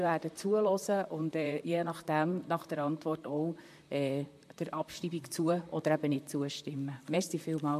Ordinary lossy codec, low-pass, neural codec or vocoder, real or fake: MP3, 64 kbps; 14.4 kHz; vocoder, 44.1 kHz, 128 mel bands, Pupu-Vocoder; fake